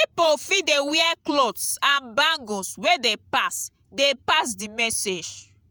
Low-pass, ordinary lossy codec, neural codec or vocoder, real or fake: none; none; vocoder, 48 kHz, 128 mel bands, Vocos; fake